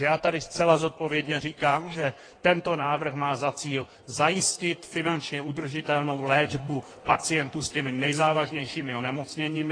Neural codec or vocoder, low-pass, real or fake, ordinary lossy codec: codec, 16 kHz in and 24 kHz out, 1.1 kbps, FireRedTTS-2 codec; 9.9 kHz; fake; AAC, 32 kbps